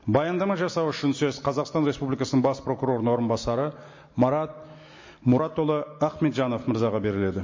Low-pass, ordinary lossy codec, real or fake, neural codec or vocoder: 7.2 kHz; MP3, 32 kbps; real; none